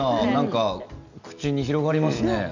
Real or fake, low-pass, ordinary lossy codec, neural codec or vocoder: real; 7.2 kHz; none; none